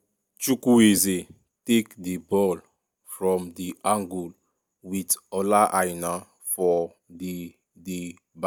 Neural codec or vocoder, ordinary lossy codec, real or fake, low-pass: none; none; real; none